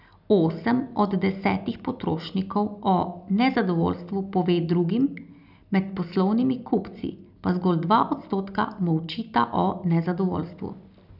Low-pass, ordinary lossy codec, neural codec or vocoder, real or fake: 5.4 kHz; none; none; real